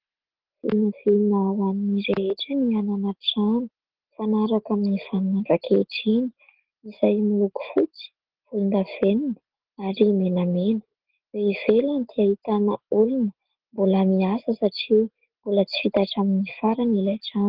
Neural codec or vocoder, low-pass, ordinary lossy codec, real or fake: none; 5.4 kHz; Opus, 16 kbps; real